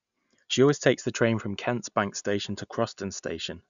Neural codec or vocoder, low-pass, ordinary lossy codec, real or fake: none; 7.2 kHz; none; real